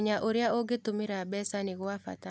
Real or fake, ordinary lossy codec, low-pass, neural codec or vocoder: real; none; none; none